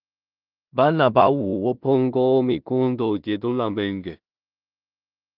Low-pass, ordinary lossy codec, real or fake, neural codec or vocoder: 5.4 kHz; Opus, 32 kbps; fake; codec, 16 kHz in and 24 kHz out, 0.4 kbps, LongCat-Audio-Codec, two codebook decoder